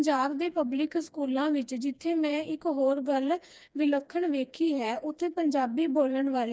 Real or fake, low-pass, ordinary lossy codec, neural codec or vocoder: fake; none; none; codec, 16 kHz, 2 kbps, FreqCodec, smaller model